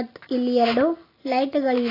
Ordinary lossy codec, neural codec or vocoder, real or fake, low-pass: AAC, 24 kbps; none; real; 5.4 kHz